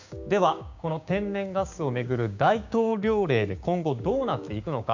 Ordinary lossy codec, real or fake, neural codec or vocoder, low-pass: none; fake; codec, 16 kHz, 6 kbps, DAC; 7.2 kHz